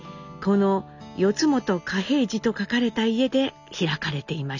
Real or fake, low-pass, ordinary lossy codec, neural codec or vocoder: real; 7.2 kHz; none; none